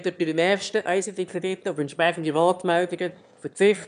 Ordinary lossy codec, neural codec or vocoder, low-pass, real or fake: none; autoencoder, 22.05 kHz, a latent of 192 numbers a frame, VITS, trained on one speaker; 9.9 kHz; fake